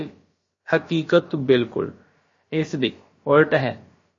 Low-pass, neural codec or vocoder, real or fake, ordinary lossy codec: 7.2 kHz; codec, 16 kHz, about 1 kbps, DyCAST, with the encoder's durations; fake; MP3, 32 kbps